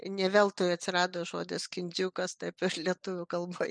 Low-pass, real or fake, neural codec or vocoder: 9.9 kHz; real; none